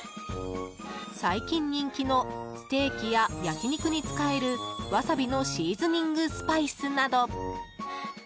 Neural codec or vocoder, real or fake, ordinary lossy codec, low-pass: none; real; none; none